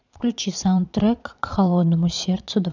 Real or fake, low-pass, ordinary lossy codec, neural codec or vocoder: fake; 7.2 kHz; none; vocoder, 22.05 kHz, 80 mel bands, Vocos